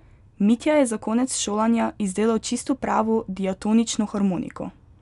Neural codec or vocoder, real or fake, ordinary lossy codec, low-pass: none; real; none; 10.8 kHz